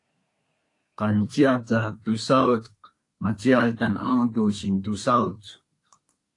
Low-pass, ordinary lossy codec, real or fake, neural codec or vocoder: 10.8 kHz; AAC, 48 kbps; fake; codec, 24 kHz, 1 kbps, SNAC